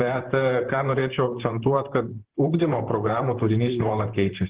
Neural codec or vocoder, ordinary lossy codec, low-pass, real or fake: vocoder, 44.1 kHz, 128 mel bands, Pupu-Vocoder; Opus, 16 kbps; 3.6 kHz; fake